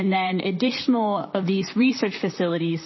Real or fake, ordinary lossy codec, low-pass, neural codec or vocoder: fake; MP3, 24 kbps; 7.2 kHz; vocoder, 44.1 kHz, 128 mel bands, Pupu-Vocoder